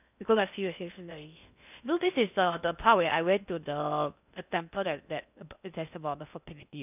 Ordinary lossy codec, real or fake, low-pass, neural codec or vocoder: none; fake; 3.6 kHz; codec, 16 kHz in and 24 kHz out, 0.6 kbps, FocalCodec, streaming, 2048 codes